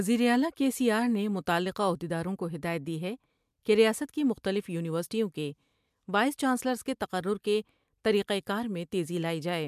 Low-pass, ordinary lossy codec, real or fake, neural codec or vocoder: 14.4 kHz; MP3, 96 kbps; real; none